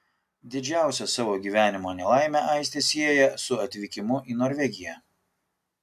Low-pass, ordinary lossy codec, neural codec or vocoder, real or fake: 14.4 kHz; AAC, 96 kbps; none; real